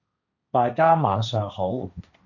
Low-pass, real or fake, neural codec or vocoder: 7.2 kHz; fake; codec, 16 kHz, 1.1 kbps, Voila-Tokenizer